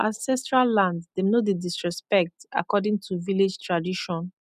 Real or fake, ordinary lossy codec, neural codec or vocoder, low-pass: real; none; none; 14.4 kHz